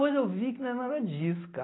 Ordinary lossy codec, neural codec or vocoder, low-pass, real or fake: AAC, 16 kbps; none; 7.2 kHz; real